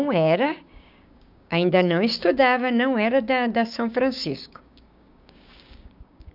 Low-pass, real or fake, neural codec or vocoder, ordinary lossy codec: 5.4 kHz; fake; codec, 16 kHz, 6 kbps, DAC; none